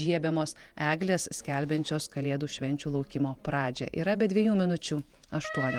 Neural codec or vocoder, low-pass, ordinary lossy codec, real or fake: vocoder, 48 kHz, 128 mel bands, Vocos; 19.8 kHz; Opus, 32 kbps; fake